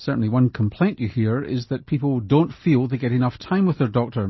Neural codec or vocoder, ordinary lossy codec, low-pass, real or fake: none; MP3, 24 kbps; 7.2 kHz; real